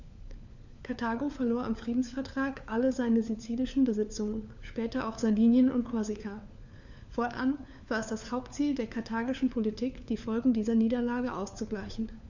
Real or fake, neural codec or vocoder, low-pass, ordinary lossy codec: fake; codec, 16 kHz, 4 kbps, FunCodec, trained on LibriTTS, 50 frames a second; 7.2 kHz; none